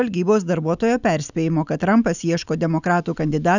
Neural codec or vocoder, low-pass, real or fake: none; 7.2 kHz; real